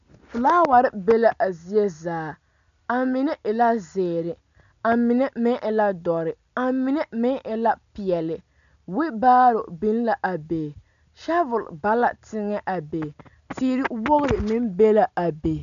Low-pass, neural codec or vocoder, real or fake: 7.2 kHz; none; real